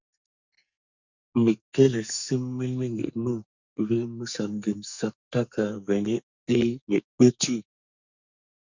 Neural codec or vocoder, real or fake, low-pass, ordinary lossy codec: codec, 44.1 kHz, 2.6 kbps, SNAC; fake; 7.2 kHz; Opus, 64 kbps